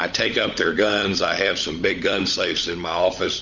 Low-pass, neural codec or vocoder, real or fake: 7.2 kHz; codec, 16 kHz, 16 kbps, FunCodec, trained on LibriTTS, 50 frames a second; fake